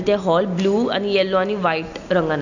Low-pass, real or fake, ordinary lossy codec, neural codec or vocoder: 7.2 kHz; real; none; none